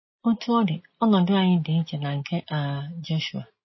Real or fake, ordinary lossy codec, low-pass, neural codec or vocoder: real; MP3, 24 kbps; 7.2 kHz; none